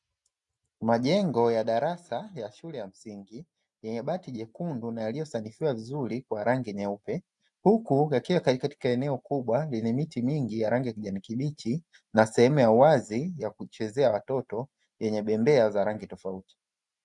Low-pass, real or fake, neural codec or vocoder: 10.8 kHz; real; none